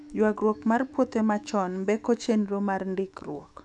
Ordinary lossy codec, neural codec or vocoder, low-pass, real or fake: none; autoencoder, 48 kHz, 128 numbers a frame, DAC-VAE, trained on Japanese speech; 10.8 kHz; fake